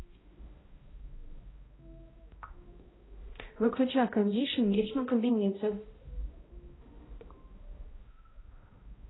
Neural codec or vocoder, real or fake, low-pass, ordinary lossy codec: codec, 16 kHz, 0.5 kbps, X-Codec, HuBERT features, trained on balanced general audio; fake; 7.2 kHz; AAC, 16 kbps